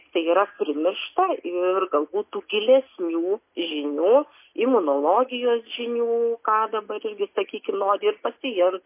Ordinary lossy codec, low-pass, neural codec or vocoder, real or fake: MP3, 24 kbps; 3.6 kHz; none; real